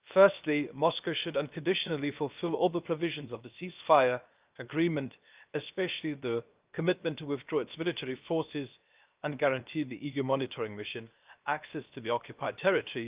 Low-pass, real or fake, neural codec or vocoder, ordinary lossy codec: 3.6 kHz; fake; codec, 16 kHz, 0.7 kbps, FocalCodec; Opus, 24 kbps